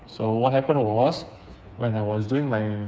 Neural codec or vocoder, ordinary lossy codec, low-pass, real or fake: codec, 16 kHz, 4 kbps, FreqCodec, smaller model; none; none; fake